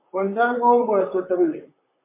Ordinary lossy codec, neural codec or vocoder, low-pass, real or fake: MP3, 24 kbps; vocoder, 44.1 kHz, 128 mel bands, Pupu-Vocoder; 3.6 kHz; fake